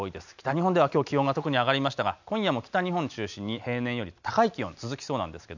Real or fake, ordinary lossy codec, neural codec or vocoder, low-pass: real; none; none; 7.2 kHz